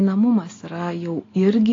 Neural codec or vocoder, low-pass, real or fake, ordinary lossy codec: none; 7.2 kHz; real; AAC, 32 kbps